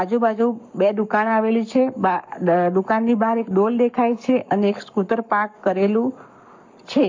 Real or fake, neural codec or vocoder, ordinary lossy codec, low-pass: fake; codec, 44.1 kHz, 7.8 kbps, Pupu-Codec; MP3, 48 kbps; 7.2 kHz